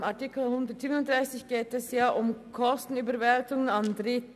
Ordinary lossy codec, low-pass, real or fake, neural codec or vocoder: none; 14.4 kHz; real; none